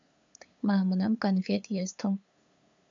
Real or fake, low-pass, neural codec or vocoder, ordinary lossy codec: fake; 7.2 kHz; codec, 16 kHz, 8 kbps, FunCodec, trained on LibriTTS, 25 frames a second; MP3, 64 kbps